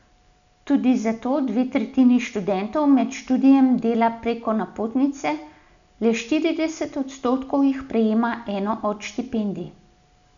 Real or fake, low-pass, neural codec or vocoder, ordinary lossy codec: real; 7.2 kHz; none; none